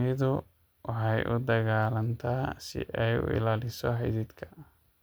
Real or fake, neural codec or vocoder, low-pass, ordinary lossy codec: real; none; none; none